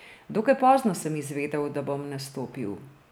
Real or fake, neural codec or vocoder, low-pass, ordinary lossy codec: real; none; none; none